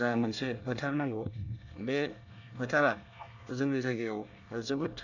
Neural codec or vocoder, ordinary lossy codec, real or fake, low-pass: codec, 24 kHz, 1 kbps, SNAC; none; fake; 7.2 kHz